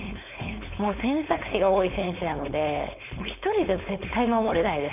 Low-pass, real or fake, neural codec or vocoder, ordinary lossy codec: 3.6 kHz; fake; codec, 16 kHz, 4.8 kbps, FACodec; none